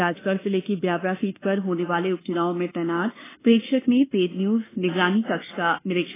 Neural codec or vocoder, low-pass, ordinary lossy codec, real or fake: codec, 24 kHz, 1.2 kbps, DualCodec; 3.6 kHz; AAC, 16 kbps; fake